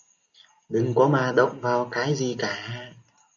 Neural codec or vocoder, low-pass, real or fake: none; 7.2 kHz; real